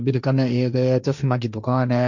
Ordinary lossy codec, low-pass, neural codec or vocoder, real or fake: none; 7.2 kHz; codec, 16 kHz, 1.1 kbps, Voila-Tokenizer; fake